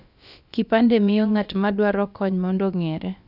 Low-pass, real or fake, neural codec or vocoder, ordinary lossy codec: 5.4 kHz; fake; codec, 16 kHz, about 1 kbps, DyCAST, with the encoder's durations; none